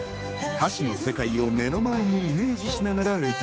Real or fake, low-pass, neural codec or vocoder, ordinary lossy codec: fake; none; codec, 16 kHz, 4 kbps, X-Codec, HuBERT features, trained on general audio; none